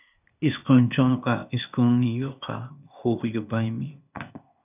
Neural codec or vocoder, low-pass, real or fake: codec, 16 kHz, 0.8 kbps, ZipCodec; 3.6 kHz; fake